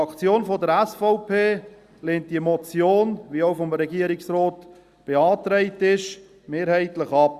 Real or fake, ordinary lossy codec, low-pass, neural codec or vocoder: real; Opus, 64 kbps; 14.4 kHz; none